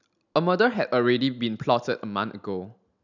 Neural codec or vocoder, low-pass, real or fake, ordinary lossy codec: none; 7.2 kHz; real; none